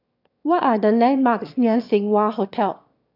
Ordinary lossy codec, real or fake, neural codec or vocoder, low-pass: AAC, 48 kbps; fake; autoencoder, 22.05 kHz, a latent of 192 numbers a frame, VITS, trained on one speaker; 5.4 kHz